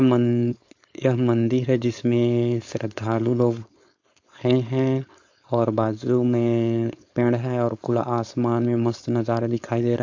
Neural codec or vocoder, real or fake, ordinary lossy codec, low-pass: codec, 16 kHz, 4.8 kbps, FACodec; fake; AAC, 48 kbps; 7.2 kHz